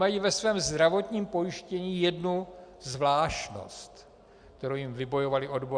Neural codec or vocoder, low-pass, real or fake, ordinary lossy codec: none; 9.9 kHz; real; Opus, 64 kbps